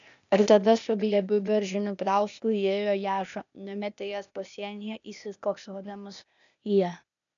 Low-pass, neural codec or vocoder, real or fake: 7.2 kHz; codec, 16 kHz, 0.8 kbps, ZipCodec; fake